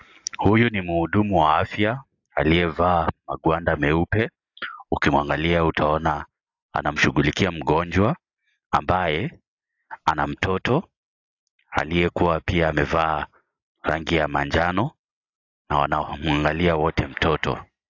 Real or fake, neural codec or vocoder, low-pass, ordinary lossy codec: real; none; 7.2 kHz; AAC, 48 kbps